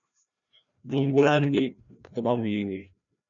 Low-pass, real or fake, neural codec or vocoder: 7.2 kHz; fake; codec, 16 kHz, 1 kbps, FreqCodec, larger model